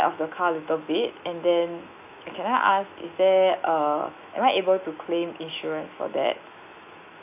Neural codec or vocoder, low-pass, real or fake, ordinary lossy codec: autoencoder, 48 kHz, 128 numbers a frame, DAC-VAE, trained on Japanese speech; 3.6 kHz; fake; none